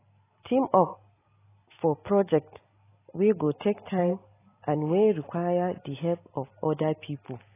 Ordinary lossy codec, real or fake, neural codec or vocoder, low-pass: AAC, 16 kbps; real; none; 3.6 kHz